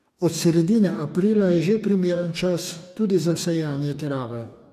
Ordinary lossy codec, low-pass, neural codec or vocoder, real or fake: none; 14.4 kHz; codec, 44.1 kHz, 2.6 kbps, DAC; fake